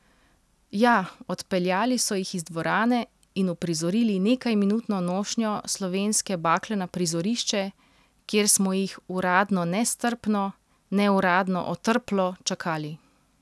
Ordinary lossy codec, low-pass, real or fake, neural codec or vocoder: none; none; real; none